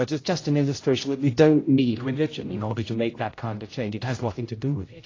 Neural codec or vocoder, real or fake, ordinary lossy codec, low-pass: codec, 16 kHz, 0.5 kbps, X-Codec, HuBERT features, trained on general audio; fake; AAC, 32 kbps; 7.2 kHz